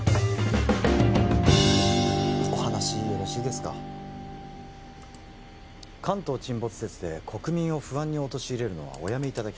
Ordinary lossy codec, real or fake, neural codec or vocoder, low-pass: none; real; none; none